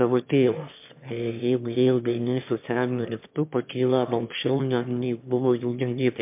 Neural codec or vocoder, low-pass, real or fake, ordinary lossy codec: autoencoder, 22.05 kHz, a latent of 192 numbers a frame, VITS, trained on one speaker; 3.6 kHz; fake; MP3, 32 kbps